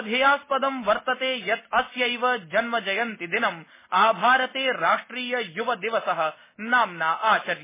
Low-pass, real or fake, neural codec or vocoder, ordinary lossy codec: 3.6 kHz; real; none; MP3, 16 kbps